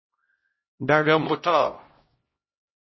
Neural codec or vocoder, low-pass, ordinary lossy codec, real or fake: codec, 16 kHz, 0.5 kbps, X-Codec, HuBERT features, trained on LibriSpeech; 7.2 kHz; MP3, 24 kbps; fake